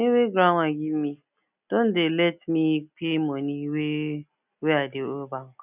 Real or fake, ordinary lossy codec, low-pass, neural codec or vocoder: real; none; 3.6 kHz; none